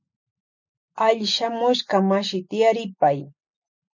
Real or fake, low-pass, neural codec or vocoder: real; 7.2 kHz; none